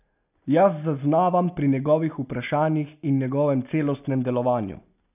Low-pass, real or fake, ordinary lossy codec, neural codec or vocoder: 3.6 kHz; real; none; none